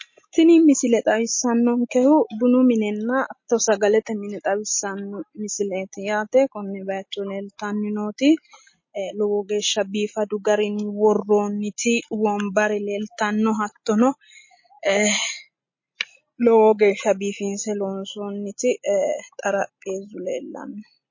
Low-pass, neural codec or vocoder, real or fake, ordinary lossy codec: 7.2 kHz; none; real; MP3, 32 kbps